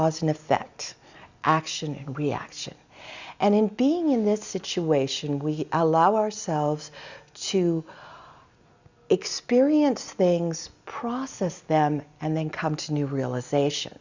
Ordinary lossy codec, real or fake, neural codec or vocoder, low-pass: Opus, 64 kbps; real; none; 7.2 kHz